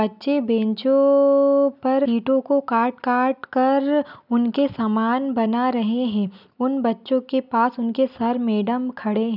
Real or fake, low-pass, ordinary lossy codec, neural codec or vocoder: real; 5.4 kHz; none; none